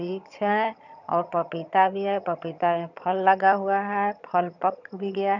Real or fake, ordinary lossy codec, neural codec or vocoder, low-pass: fake; none; vocoder, 22.05 kHz, 80 mel bands, HiFi-GAN; 7.2 kHz